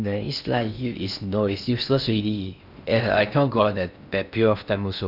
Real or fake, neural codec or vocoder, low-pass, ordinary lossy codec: fake; codec, 16 kHz in and 24 kHz out, 0.6 kbps, FocalCodec, streaming, 4096 codes; 5.4 kHz; none